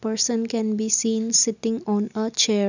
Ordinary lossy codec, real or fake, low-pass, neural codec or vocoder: none; real; 7.2 kHz; none